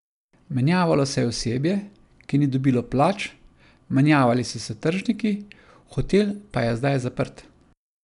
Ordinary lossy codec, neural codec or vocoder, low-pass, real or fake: none; none; 10.8 kHz; real